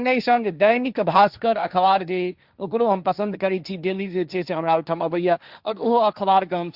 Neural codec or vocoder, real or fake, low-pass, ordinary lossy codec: codec, 16 kHz, 1.1 kbps, Voila-Tokenizer; fake; 5.4 kHz; Opus, 64 kbps